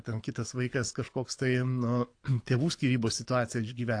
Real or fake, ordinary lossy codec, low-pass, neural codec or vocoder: fake; AAC, 48 kbps; 9.9 kHz; codec, 24 kHz, 6 kbps, HILCodec